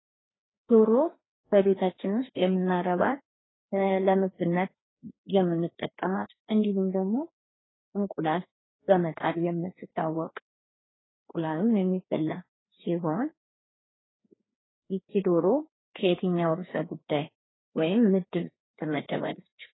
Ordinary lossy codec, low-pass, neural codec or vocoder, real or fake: AAC, 16 kbps; 7.2 kHz; codec, 16 kHz, 2 kbps, FreqCodec, larger model; fake